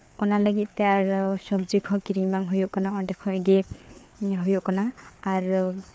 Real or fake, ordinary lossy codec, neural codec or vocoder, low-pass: fake; none; codec, 16 kHz, 4 kbps, FreqCodec, larger model; none